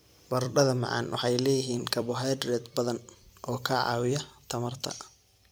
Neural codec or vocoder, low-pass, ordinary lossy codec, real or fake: vocoder, 44.1 kHz, 128 mel bands every 256 samples, BigVGAN v2; none; none; fake